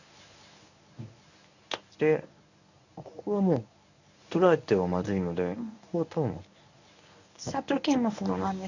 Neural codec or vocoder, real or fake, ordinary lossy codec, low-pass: codec, 24 kHz, 0.9 kbps, WavTokenizer, medium speech release version 1; fake; none; 7.2 kHz